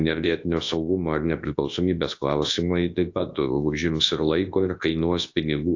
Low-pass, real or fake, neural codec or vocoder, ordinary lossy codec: 7.2 kHz; fake; codec, 24 kHz, 0.9 kbps, WavTokenizer, large speech release; AAC, 48 kbps